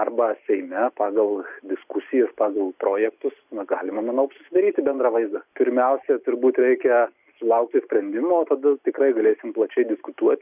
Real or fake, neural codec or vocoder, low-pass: real; none; 3.6 kHz